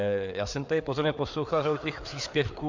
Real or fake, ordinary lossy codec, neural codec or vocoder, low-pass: fake; MP3, 64 kbps; codec, 16 kHz, 4 kbps, FreqCodec, larger model; 7.2 kHz